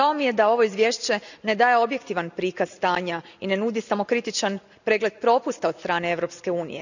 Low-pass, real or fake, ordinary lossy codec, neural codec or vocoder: 7.2 kHz; real; none; none